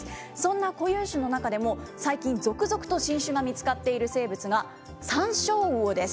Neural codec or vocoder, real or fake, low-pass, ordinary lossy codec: none; real; none; none